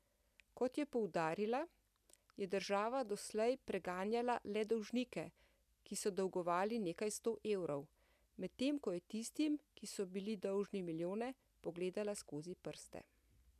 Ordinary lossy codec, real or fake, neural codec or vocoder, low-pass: none; real; none; 14.4 kHz